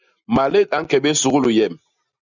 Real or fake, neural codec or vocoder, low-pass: real; none; 7.2 kHz